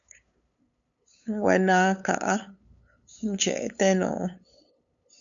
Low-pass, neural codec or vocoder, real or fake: 7.2 kHz; codec, 16 kHz, 8 kbps, FunCodec, trained on LibriTTS, 25 frames a second; fake